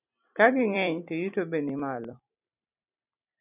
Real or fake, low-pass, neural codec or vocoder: fake; 3.6 kHz; vocoder, 44.1 kHz, 128 mel bands every 256 samples, BigVGAN v2